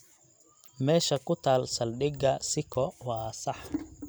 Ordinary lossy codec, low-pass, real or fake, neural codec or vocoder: none; none; real; none